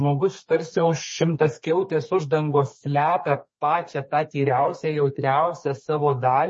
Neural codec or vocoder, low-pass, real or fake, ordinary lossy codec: codec, 32 kHz, 1.9 kbps, SNAC; 10.8 kHz; fake; MP3, 32 kbps